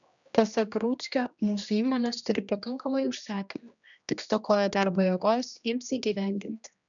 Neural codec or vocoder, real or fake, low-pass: codec, 16 kHz, 1 kbps, X-Codec, HuBERT features, trained on general audio; fake; 7.2 kHz